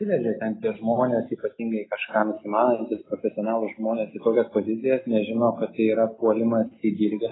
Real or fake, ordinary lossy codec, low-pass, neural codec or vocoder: real; AAC, 16 kbps; 7.2 kHz; none